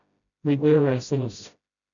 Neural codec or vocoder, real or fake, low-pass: codec, 16 kHz, 0.5 kbps, FreqCodec, smaller model; fake; 7.2 kHz